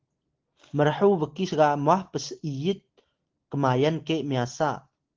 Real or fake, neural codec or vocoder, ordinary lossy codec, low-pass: real; none; Opus, 16 kbps; 7.2 kHz